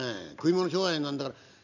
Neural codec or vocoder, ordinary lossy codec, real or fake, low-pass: none; none; real; 7.2 kHz